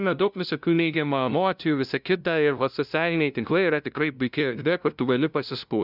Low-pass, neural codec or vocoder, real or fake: 5.4 kHz; codec, 16 kHz, 0.5 kbps, FunCodec, trained on LibriTTS, 25 frames a second; fake